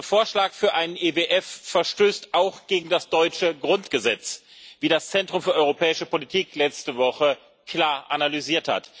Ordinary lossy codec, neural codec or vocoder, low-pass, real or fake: none; none; none; real